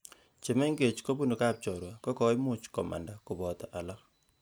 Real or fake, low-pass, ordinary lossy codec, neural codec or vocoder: real; none; none; none